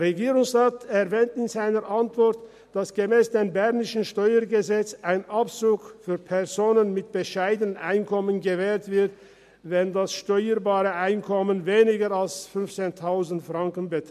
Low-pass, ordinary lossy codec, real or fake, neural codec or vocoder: 14.4 kHz; MP3, 64 kbps; real; none